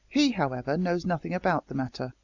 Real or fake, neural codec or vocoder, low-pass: real; none; 7.2 kHz